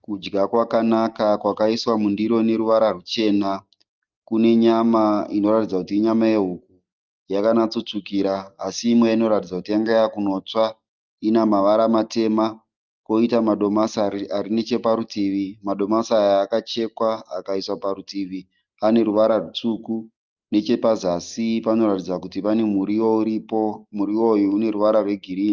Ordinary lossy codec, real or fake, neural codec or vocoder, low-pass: Opus, 32 kbps; real; none; 7.2 kHz